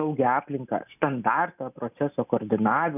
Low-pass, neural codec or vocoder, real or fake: 3.6 kHz; none; real